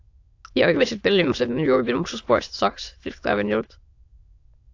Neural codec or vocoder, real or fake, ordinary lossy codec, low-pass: autoencoder, 22.05 kHz, a latent of 192 numbers a frame, VITS, trained on many speakers; fake; AAC, 48 kbps; 7.2 kHz